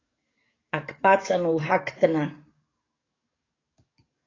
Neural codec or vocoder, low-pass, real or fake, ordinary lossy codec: codec, 44.1 kHz, 7.8 kbps, DAC; 7.2 kHz; fake; AAC, 32 kbps